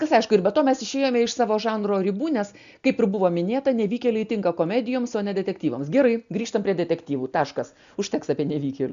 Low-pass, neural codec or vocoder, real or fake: 7.2 kHz; none; real